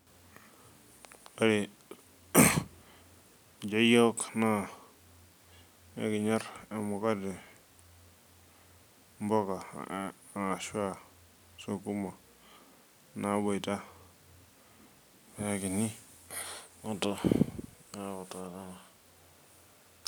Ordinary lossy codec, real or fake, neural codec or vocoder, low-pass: none; real; none; none